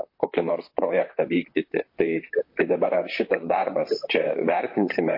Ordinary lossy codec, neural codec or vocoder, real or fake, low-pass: MP3, 32 kbps; vocoder, 44.1 kHz, 128 mel bands, Pupu-Vocoder; fake; 5.4 kHz